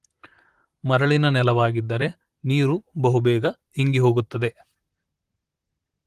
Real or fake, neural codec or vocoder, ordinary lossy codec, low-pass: fake; vocoder, 44.1 kHz, 128 mel bands, Pupu-Vocoder; Opus, 24 kbps; 14.4 kHz